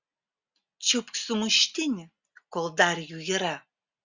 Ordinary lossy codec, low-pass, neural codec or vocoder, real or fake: Opus, 64 kbps; 7.2 kHz; none; real